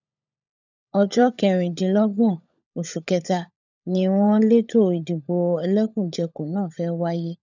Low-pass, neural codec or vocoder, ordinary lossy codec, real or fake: 7.2 kHz; codec, 16 kHz, 16 kbps, FunCodec, trained on LibriTTS, 50 frames a second; none; fake